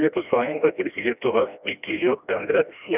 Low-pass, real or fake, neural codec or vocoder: 3.6 kHz; fake; codec, 16 kHz, 1 kbps, FreqCodec, smaller model